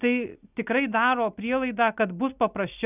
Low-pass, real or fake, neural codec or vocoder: 3.6 kHz; real; none